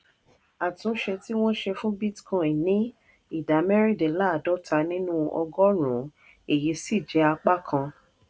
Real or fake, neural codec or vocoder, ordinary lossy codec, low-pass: real; none; none; none